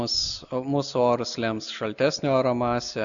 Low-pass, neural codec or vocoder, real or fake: 7.2 kHz; none; real